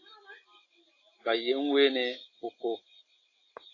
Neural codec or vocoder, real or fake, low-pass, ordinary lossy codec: none; real; 7.2 kHz; AAC, 32 kbps